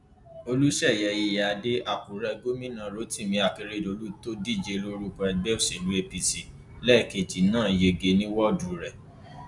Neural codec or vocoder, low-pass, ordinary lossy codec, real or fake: none; 10.8 kHz; none; real